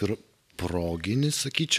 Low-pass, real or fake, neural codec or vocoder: 14.4 kHz; real; none